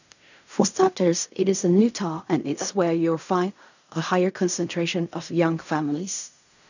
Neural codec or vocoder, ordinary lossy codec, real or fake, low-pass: codec, 16 kHz in and 24 kHz out, 0.4 kbps, LongCat-Audio-Codec, fine tuned four codebook decoder; none; fake; 7.2 kHz